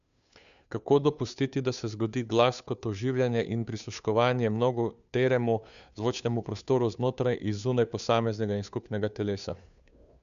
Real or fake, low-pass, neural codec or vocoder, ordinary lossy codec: fake; 7.2 kHz; codec, 16 kHz, 2 kbps, FunCodec, trained on Chinese and English, 25 frames a second; none